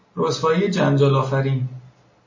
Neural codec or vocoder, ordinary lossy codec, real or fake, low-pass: none; MP3, 32 kbps; real; 7.2 kHz